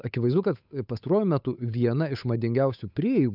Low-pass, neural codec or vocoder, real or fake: 5.4 kHz; codec, 16 kHz, 8 kbps, FunCodec, trained on Chinese and English, 25 frames a second; fake